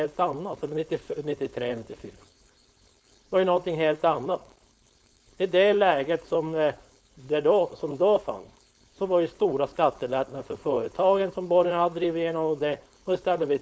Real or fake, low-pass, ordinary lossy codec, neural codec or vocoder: fake; none; none; codec, 16 kHz, 4.8 kbps, FACodec